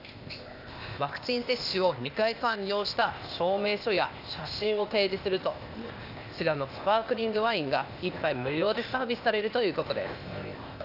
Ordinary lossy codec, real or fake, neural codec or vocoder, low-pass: none; fake; codec, 16 kHz, 0.8 kbps, ZipCodec; 5.4 kHz